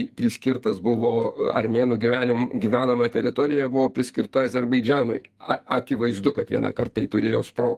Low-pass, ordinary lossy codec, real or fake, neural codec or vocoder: 14.4 kHz; Opus, 32 kbps; fake; codec, 44.1 kHz, 2.6 kbps, SNAC